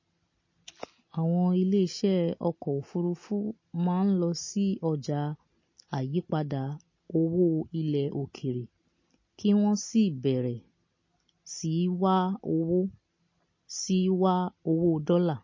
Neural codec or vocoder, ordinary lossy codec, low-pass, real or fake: none; MP3, 32 kbps; 7.2 kHz; real